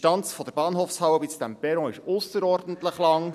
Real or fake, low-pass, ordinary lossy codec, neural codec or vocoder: real; 14.4 kHz; none; none